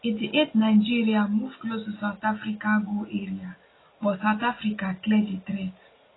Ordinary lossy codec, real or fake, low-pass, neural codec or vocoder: AAC, 16 kbps; real; 7.2 kHz; none